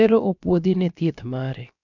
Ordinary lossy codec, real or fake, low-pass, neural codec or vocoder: none; fake; 7.2 kHz; codec, 16 kHz, about 1 kbps, DyCAST, with the encoder's durations